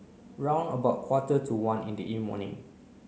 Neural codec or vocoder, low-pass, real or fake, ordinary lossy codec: none; none; real; none